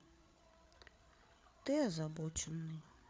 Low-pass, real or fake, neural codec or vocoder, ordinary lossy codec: none; fake; codec, 16 kHz, 8 kbps, FreqCodec, larger model; none